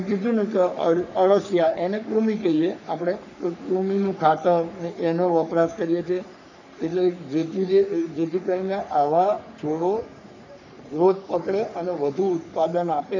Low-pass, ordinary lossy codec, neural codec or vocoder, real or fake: 7.2 kHz; none; codec, 44.1 kHz, 3.4 kbps, Pupu-Codec; fake